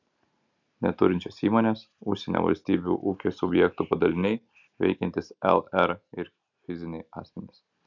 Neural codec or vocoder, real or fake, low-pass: none; real; 7.2 kHz